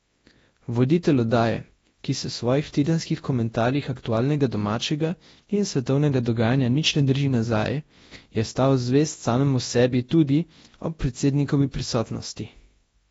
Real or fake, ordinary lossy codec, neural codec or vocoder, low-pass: fake; AAC, 24 kbps; codec, 24 kHz, 0.9 kbps, WavTokenizer, large speech release; 10.8 kHz